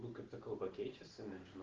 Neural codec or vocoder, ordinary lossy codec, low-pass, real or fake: none; Opus, 16 kbps; 7.2 kHz; real